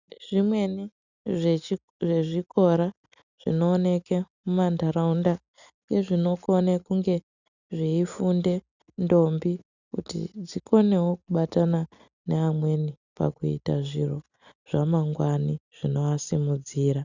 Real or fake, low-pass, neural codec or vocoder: real; 7.2 kHz; none